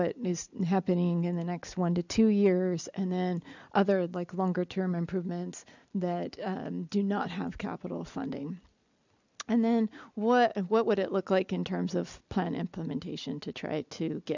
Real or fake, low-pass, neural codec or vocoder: real; 7.2 kHz; none